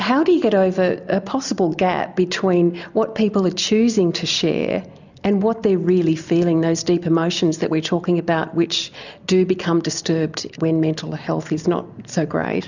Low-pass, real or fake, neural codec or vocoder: 7.2 kHz; real; none